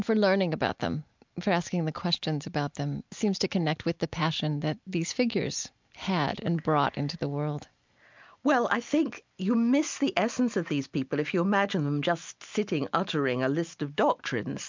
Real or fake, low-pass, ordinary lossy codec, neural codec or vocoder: real; 7.2 kHz; MP3, 64 kbps; none